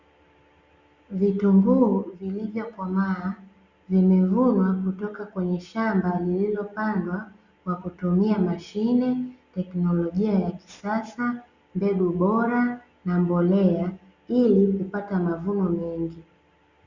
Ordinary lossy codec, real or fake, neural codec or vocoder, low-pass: Opus, 64 kbps; real; none; 7.2 kHz